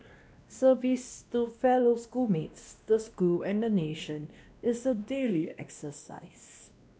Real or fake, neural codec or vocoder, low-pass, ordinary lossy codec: fake; codec, 16 kHz, 1 kbps, X-Codec, WavLM features, trained on Multilingual LibriSpeech; none; none